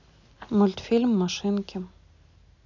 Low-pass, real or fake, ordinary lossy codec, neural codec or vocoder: 7.2 kHz; real; none; none